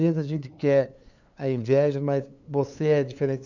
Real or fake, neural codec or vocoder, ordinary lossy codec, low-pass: fake; codec, 16 kHz, 2 kbps, FunCodec, trained on LibriTTS, 25 frames a second; none; 7.2 kHz